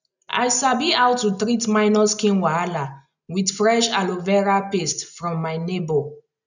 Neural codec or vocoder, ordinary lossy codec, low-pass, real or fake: none; none; 7.2 kHz; real